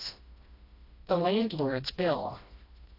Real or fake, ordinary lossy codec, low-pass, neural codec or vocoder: fake; AAC, 32 kbps; 5.4 kHz; codec, 16 kHz, 1 kbps, FreqCodec, smaller model